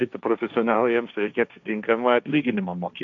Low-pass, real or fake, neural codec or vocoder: 7.2 kHz; fake; codec, 16 kHz, 1.1 kbps, Voila-Tokenizer